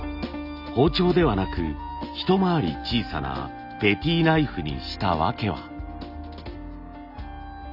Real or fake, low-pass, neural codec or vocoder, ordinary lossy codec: real; 5.4 kHz; none; Opus, 64 kbps